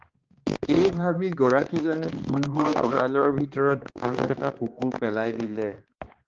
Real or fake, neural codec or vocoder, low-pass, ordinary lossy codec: fake; codec, 16 kHz, 2 kbps, X-Codec, HuBERT features, trained on balanced general audio; 7.2 kHz; Opus, 16 kbps